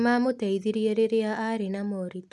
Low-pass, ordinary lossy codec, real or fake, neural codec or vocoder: none; none; real; none